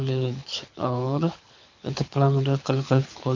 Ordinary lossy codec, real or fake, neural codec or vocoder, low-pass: MP3, 64 kbps; fake; codec, 24 kHz, 6 kbps, HILCodec; 7.2 kHz